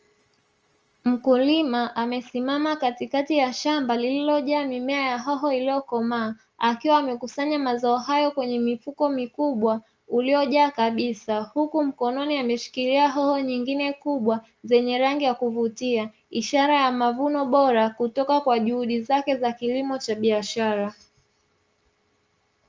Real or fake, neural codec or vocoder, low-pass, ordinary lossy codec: real; none; 7.2 kHz; Opus, 24 kbps